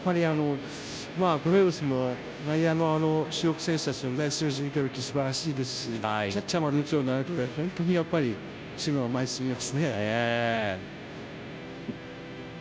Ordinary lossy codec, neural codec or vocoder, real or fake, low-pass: none; codec, 16 kHz, 0.5 kbps, FunCodec, trained on Chinese and English, 25 frames a second; fake; none